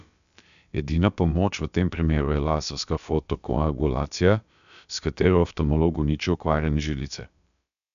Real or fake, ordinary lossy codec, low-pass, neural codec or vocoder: fake; none; 7.2 kHz; codec, 16 kHz, about 1 kbps, DyCAST, with the encoder's durations